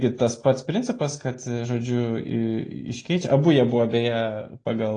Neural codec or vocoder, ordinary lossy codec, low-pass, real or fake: none; AAC, 32 kbps; 9.9 kHz; real